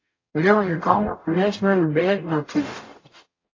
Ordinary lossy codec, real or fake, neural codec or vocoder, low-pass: AAC, 32 kbps; fake; codec, 44.1 kHz, 0.9 kbps, DAC; 7.2 kHz